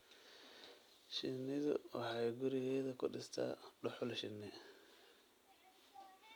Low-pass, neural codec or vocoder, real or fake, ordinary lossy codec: none; none; real; none